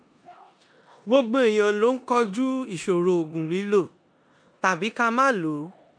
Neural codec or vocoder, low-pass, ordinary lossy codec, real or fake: codec, 16 kHz in and 24 kHz out, 0.9 kbps, LongCat-Audio-Codec, four codebook decoder; 9.9 kHz; MP3, 96 kbps; fake